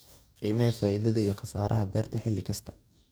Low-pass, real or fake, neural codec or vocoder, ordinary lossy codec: none; fake; codec, 44.1 kHz, 2.6 kbps, DAC; none